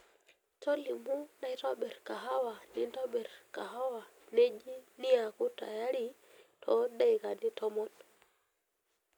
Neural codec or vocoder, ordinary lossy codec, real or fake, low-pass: none; none; real; none